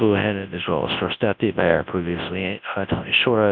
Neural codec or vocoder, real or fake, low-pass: codec, 24 kHz, 0.9 kbps, WavTokenizer, large speech release; fake; 7.2 kHz